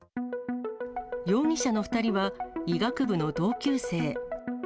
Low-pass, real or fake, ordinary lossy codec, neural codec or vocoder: none; real; none; none